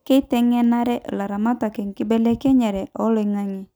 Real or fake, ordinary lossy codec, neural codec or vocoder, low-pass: real; none; none; none